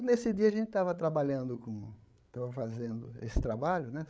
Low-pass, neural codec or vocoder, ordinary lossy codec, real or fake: none; codec, 16 kHz, 8 kbps, FreqCodec, larger model; none; fake